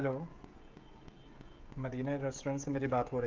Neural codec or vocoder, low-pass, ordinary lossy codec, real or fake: codec, 16 kHz, 16 kbps, FreqCodec, smaller model; 7.2 kHz; Opus, 24 kbps; fake